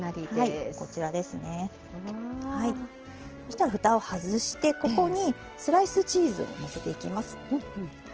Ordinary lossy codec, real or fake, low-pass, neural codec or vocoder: Opus, 16 kbps; real; 7.2 kHz; none